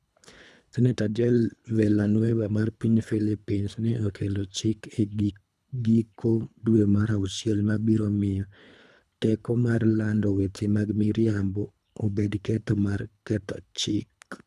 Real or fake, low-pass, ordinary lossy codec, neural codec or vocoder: fake; none; none; codec, 24 kHz, 3 kbps, HILCodec